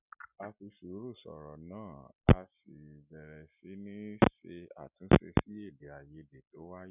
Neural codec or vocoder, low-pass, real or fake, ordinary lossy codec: none; 3.6 kHz; real; none